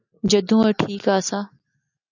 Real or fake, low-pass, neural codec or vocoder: real; 7.2 kHz; none